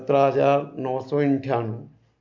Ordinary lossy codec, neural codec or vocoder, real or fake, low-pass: MP3, 48 kbps; none; real; 7.2 kHz